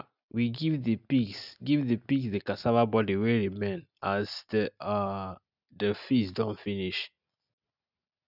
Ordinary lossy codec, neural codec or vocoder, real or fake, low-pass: none; none; real; 5.4 kHz